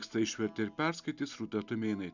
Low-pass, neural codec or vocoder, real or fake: 7.2 kHz; none; real